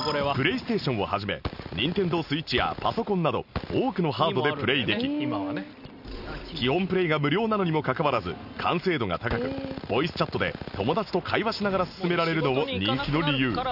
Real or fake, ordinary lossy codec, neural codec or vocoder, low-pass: real; none; none; 5.4 kHz